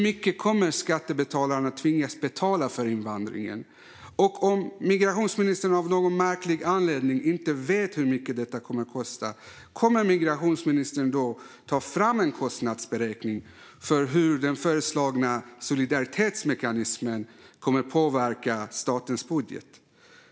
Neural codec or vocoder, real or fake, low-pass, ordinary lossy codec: none; real; none; none